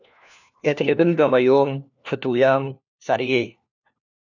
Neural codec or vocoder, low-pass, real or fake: codec, 16 kHz, 1 kbps, FunCodec, trained on LibriTTS, 50 frames a second; 7.2 kHz; fake